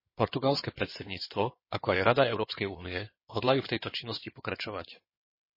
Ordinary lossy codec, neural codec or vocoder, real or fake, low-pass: MP3, 24 kbps; codec, 16 kHz in and 24 kHz out, 2.2 kbps, FireRedTTS-2 codec; fake; 5.4 kHz